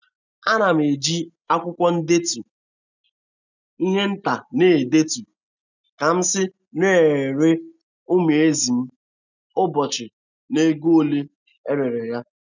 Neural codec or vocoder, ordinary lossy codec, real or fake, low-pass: none; none; real; 7.2 kHz